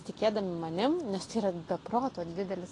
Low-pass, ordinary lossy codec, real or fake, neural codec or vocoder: 10.8 kHz; AAC, 32 kbps; real; none